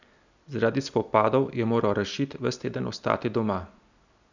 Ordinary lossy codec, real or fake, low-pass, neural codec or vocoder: none; real; 7.2 kHz; none